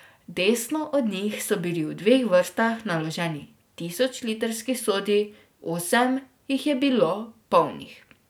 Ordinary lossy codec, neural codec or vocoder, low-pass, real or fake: none; none; none; real